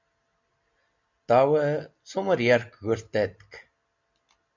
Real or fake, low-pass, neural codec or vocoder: real; 7.2 kHz; none